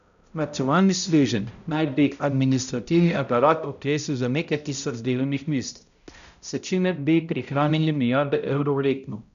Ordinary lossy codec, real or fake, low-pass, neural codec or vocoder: none; fake; 7.2 kHz; codec, 16 kHz, 0.5 kbps, X-Codec, HuBERT features, trained on balanced general audio